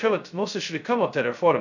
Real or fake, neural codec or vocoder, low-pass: fake; codec, 16 kHz, 0.2 kbps, FocalCodec; 7.2 kHz